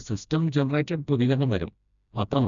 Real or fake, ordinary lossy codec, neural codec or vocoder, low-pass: fake; none; codec, 16 kHz, 1 kbps, FreqCodec, smaller model; 7.2 kHz